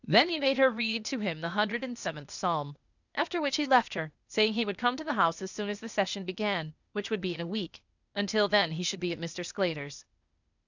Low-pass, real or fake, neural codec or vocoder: 7.2 kHz; fake; codec, 16 kHz, 0.8 kbps, ZipCodec